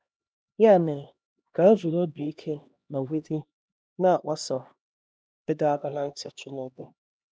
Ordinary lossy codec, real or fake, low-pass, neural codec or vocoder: none; fake; none; codec, 16 kHz, 1 kbps, X-Codec, HuBERT features, trained on LibriSpeech